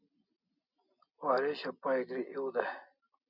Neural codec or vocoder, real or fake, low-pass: none; real; 5.4 kHz